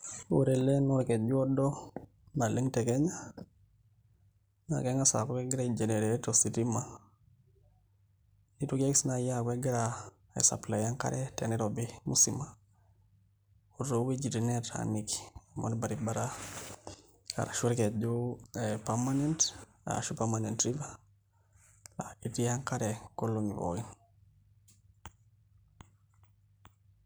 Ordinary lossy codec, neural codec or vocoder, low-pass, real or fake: none; none; none; real